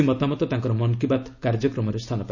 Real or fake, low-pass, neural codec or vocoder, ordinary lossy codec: real; 7.2 kHz; none; none